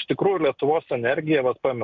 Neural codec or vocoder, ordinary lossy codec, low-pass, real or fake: none; MP3, 64 kbps; 7.2 kHz; real